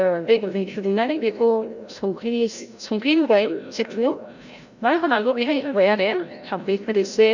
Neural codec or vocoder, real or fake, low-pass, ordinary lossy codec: codec, 16 kHz, 0.5 kbps, FreqCodec, larger model; fake; 7.2 kHz; none